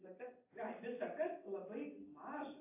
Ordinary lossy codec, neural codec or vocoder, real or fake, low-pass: AAC, 32 kbps; none; real; 3.6 kHz